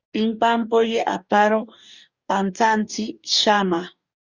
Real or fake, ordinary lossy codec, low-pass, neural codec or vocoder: fake; Opus, 64 kbps; 7.2 kHz; codec, 44.1 kHz, 2.6 kbps, DAC